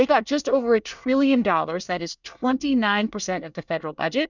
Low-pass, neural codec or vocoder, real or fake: 7.2 kHz; codec, 24 kHz, 1 kbps, SNAC; fake